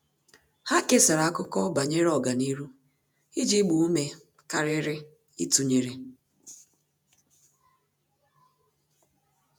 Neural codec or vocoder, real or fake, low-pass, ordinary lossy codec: vocoder, 48 kHz, 128 mel bands, Vocos; fake; none; none